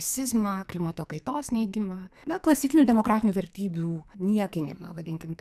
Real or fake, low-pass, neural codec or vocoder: fake; 14.4 kHz; codec, 44.1 kHz, 2.6 kbps, SNAC